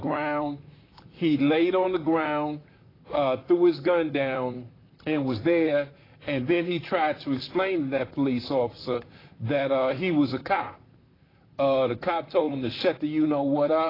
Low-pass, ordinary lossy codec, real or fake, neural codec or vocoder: 5.4 kHz; AAC, 24 kbps; fake; vocoder, 44.1 kHz, 128 mel bands, Pupu-Vocoder